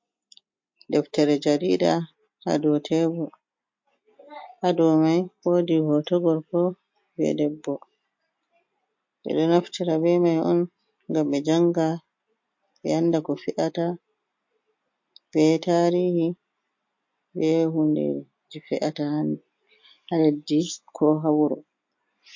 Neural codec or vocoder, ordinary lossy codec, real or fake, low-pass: none; MP3, 48 kbps; real; 7.2 kHz